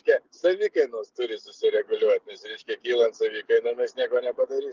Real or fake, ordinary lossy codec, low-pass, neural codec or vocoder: fake; Opus, 32 kbps; 7.2 kHz; codec, 16 kHz, 16 kbps, FreqCodec, smaller model